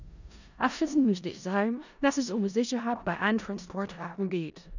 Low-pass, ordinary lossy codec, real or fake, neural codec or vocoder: 7.2 kHz; none; fake; codec, 16 kHz in and 24 kHz out, 0.4 kbps, LongCat-Audio-Codec, four codebook decoder